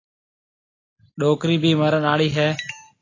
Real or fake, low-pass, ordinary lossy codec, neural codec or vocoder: real; 7.2 kHz; AAC, 32 kbps; none